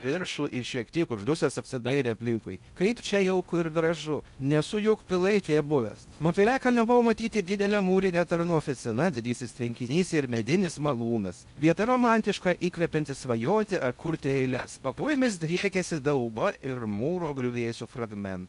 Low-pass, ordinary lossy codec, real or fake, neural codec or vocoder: 10.8 kHz; MP3, 96 kbps; fake; codec, 16 kHz in and 24 kHz out, 0.6 kbps, FocalCodec, streaming, 2048 codes